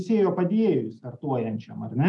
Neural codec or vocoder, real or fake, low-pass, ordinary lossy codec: none; real; 10.8 kHz; MP3, 96 kbps